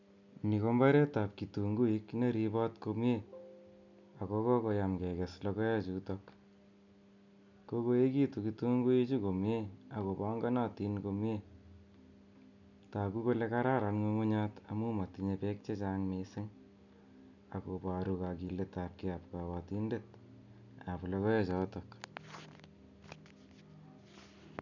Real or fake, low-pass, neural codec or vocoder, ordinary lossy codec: real; 7.2 kHz; none; none